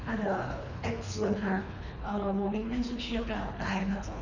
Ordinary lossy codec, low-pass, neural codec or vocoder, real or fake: none; 7.2 kHz; codec, 24 kHz, 3 kbps, HILCodec; fake